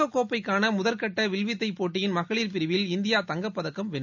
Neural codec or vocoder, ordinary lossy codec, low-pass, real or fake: none; none; 7.2 kHz; real